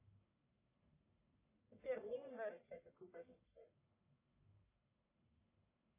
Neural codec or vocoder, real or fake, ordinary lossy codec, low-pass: codec, 44.1 kHz, 1.7 kbps, Pupu-Codec; fake; AAC, 32 kbps; 3.6 kHz